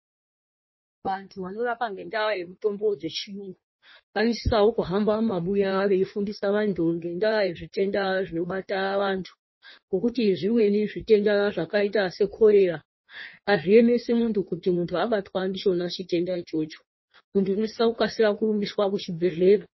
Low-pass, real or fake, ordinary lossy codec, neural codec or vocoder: 7.2 kHz; fake; MP3, 24 kbps; codec, 16 kHz in and 24 kHz out, 1.1 kbps, FireRedTTS-2 codec